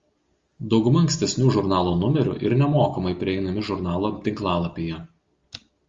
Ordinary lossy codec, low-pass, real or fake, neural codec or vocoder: Opus, 32 kbps; 7.2 kHz; real; none